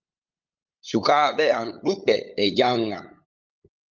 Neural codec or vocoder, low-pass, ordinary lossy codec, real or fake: codec, 16 kHz, 8 kbps, FunCodec, trained on LibriTTS, 25 frames a second; 7.2 kHz; Opus, 24 kbps; fake